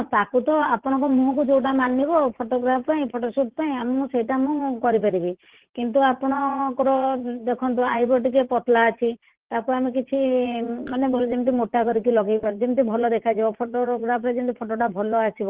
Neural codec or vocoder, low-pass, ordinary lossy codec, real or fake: vocoder, 44.1 kHz, 80 mel bands, Vocos; 3.6 kHz; Opus, 16 kbps; fake